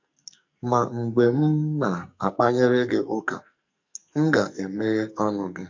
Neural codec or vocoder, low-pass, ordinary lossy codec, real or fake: codec, 44.1 kHz, 2.6 kbps, SNAC; 7.2 kHz; MP3, 48 kbps; fake